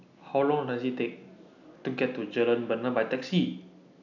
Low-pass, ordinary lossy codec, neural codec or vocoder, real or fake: 7.2 kHz; none; none; real